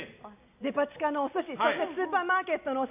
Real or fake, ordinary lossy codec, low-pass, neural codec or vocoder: real; none; 3.6 kHz; none